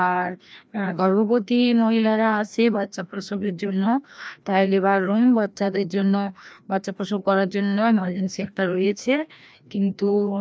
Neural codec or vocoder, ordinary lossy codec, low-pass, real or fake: codec, 16 kHz, 1 kbps, FreqCodec, larger model; none; none; fake